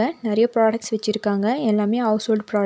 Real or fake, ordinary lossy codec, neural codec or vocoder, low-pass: real; none; none; none